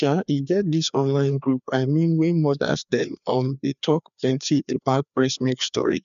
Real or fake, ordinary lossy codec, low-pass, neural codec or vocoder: fake; MP3, 96 kbps; 7.2 kHz; codec, 16 kHz, 2 kbps, FreqCodec, larger model